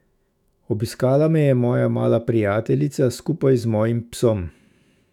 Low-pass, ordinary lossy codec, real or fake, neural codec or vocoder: 19.8 kHz; none; fake; autoencoder, 48 kHz, 128 numbers a frame, DAC-VAE, trained on Japanese speech